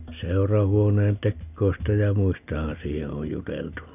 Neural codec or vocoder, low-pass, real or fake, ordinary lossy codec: none; 3.6 kHz; real; none